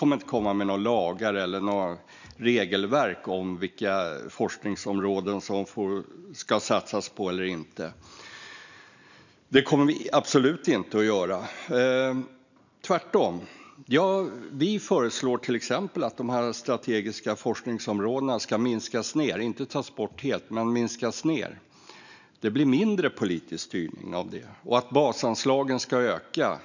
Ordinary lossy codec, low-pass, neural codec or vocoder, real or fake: none; 7.2 kHz; none; real